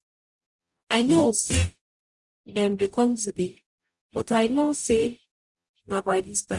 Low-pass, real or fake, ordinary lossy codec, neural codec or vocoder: 10.8 kHz; fake; Opus, 64 kbps; codec, 44.1 kHz, 0.9 kbps, DAC